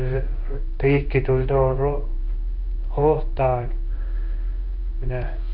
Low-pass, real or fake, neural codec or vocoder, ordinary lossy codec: 5.4 kHz; fake; codec, 16 kHz in and 24 kHz out, 1 kbps, XY-Tokenizer; none